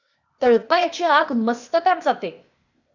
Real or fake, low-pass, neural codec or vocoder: fake; 7.2 kHz; codec, 16 kHz, 0.8 kbps, ZipCodec